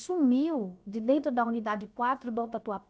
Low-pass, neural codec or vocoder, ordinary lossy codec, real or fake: none; codec, 16 kHz, about 1 kbps, DyCAST, with the encoder's durations; none; fake